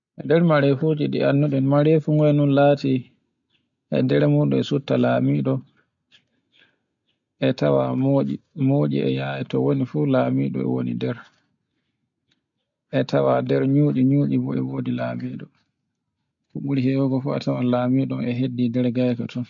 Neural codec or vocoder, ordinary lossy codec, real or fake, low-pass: none; MP3, 64 kbps; real; 7.2 kHz